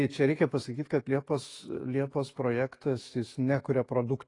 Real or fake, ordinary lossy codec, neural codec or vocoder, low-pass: fake; AAC, 32 kbps; autoencoder, 48 kHz, 128 numbers a frame, DAC-VAE, trained on Japanese speech; 10.8 kHz